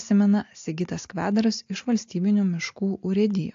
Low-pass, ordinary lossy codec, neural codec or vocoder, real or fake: 7.2 kHz; AAC, 48 kbps; none; real